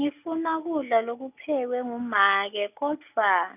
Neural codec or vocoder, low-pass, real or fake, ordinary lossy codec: none; 3.6 kHz; real; none